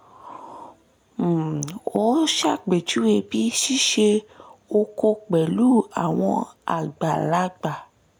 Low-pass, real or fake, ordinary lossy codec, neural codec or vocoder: none; real; none; none